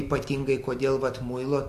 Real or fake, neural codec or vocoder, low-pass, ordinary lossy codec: real; none; 14.4 kHz; MP3, 64 kbps